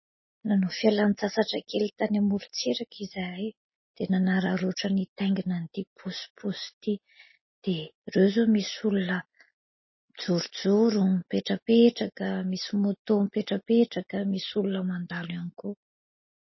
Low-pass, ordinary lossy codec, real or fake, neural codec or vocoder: 7.2 kHz; MP3, 24 kbps; real; none